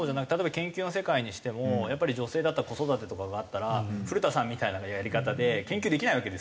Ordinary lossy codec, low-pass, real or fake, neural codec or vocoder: none; none; real; none